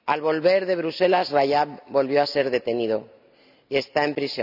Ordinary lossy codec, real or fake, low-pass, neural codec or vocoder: none; real; 5.4 kHz; none